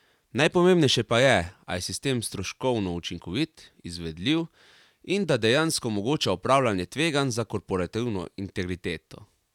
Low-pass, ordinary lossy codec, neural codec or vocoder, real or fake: 19.8 kHz; none; none; real